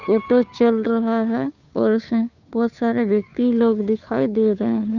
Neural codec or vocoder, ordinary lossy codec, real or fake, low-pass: codec, 16 kHz, 2 kbps, FunCodec, trained on Chinese and English, 25 frames a second; none; fake; 7.2 kHz